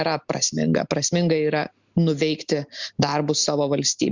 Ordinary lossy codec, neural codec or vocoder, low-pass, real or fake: Opus, 64 kbps; none; 7.2 kHz; real